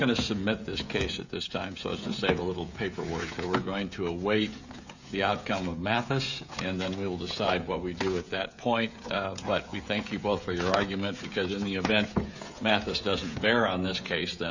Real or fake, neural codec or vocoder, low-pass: fake; codec, 16 kHz, 16 kbps, FreqCodec, smaller model; 7.2 kHz